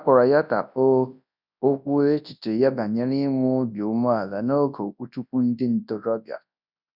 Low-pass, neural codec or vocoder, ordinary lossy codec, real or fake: 5.4 kHz; codec, 24 kHz, 0.9 kbps, WavTokenizer, large speech release; none; fake